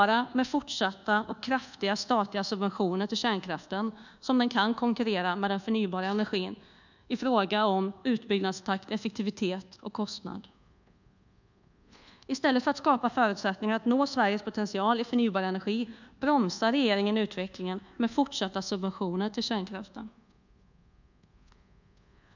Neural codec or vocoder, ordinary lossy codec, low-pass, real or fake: codec, 24 kHz, 1.2 kbps, DualCodec; none; 7.2 kHz; fake